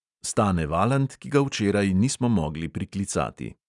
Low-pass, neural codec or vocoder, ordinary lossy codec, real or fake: 10.8 kHz; none; none; real